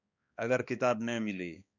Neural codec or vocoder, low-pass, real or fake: codec, 16 kHz, 2 kbps, X-Codec, HuBERT features, trained on balanced general audio; 7.2 kHz; fake